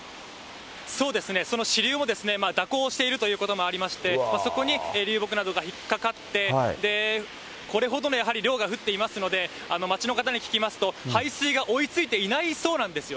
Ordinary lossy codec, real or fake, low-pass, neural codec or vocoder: none; real; none; none